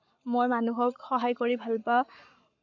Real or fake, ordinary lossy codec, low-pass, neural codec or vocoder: fake; none; 7.2 kHz; codec, 44.1 kHz, 7.8 kbps, Pupu-Codec